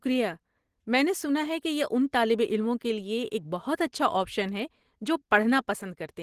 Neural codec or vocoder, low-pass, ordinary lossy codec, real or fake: none; 14.4 kHz; Opus, 16 kbps; real